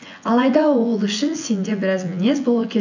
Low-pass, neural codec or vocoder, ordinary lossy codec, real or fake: 7.2 kHz; vocoder, 24 kHz, 100 mel bands, Vocos; none; fake